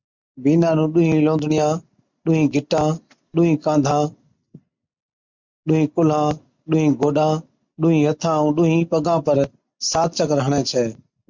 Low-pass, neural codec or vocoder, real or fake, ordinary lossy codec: 7.2 kHz; none; real; MP3, 64 kbps